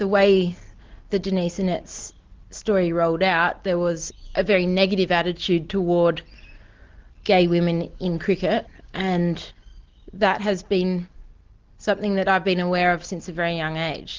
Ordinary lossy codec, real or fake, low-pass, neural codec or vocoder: Opus, 16 kbps; real; 7.2 kHz; none